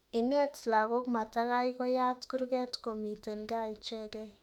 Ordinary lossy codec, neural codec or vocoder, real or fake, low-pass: none; autoencoder, 48 kHz, 32 numbers a frame, DAC-VAE, trained on Japanese speech; fake; 19.8 kHz